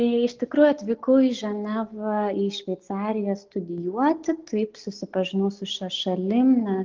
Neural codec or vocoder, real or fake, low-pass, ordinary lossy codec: none; real; 7.2 kHz; Opus, 32 kbps